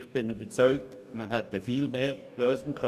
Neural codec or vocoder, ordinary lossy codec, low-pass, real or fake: codec, 44.1 kHz, 2.6 kbps, DAC; none; 14.4 kHz; fake